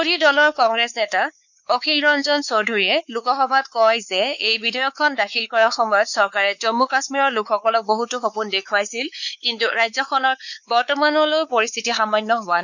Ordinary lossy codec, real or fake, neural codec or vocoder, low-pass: none; fake; codec, 16 kHz, 4 kbps, X-Codec, WavLM features, trained on Multilingual LibriSpeech; 7.2 kHz